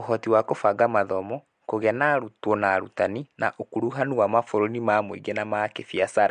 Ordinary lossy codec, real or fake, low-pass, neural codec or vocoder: MP3, 64 kbps; real; 9.9 kHz; none